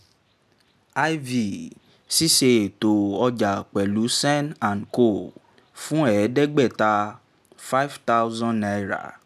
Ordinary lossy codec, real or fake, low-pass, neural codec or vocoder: none; real; 14.4 kHz; none